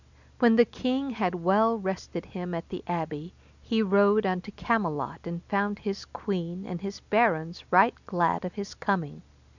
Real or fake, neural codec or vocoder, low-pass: real; none; 7.2 kHz